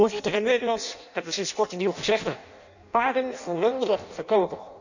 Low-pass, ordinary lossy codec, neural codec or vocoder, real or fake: 7.2 kHz; none; codec, 16 kHz in and 24 kHz out, 0.6 kbps, FireRedTTS-2 codec; fake